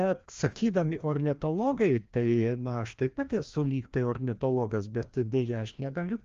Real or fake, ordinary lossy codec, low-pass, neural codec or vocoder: fake; Opus, 32 kbps; 7.2 kHz; codec, 16 kHz, 1 kbps, FreqCodec, larger model